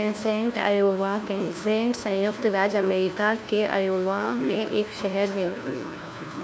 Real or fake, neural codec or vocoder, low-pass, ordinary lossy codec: fake; codec, 16 kHz, 1 kbps, FunCodec, trained on LibriTTS, 50 frames a second; none; none